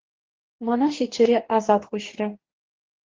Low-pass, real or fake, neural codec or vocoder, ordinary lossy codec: 7.2 kHz; fake; codec, 44.1 kHz, 2.6 kbps, DAC; Opus, 16 kbps